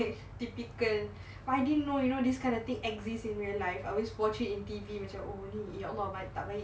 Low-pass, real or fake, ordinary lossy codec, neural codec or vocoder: none; real; none; none